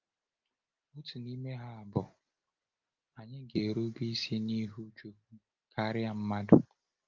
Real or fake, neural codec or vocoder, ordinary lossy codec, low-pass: real; none; Opus, 24 kbps; 7.2 kHz